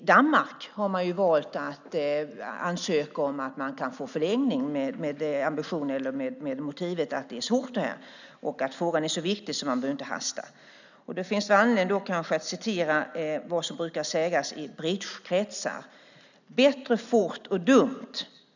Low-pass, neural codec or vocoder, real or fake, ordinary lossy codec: 7.2 kHz; none; real; none